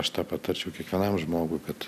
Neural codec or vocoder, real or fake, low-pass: none; real; 14.4 kHz